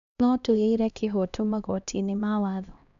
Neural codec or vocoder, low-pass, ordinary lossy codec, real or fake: codec, 16 kHz, 1 kbps, X-Codec, HuBERT features, trained on LibriSpeech; 7.2 kHz; AAC, 96 kbps; fake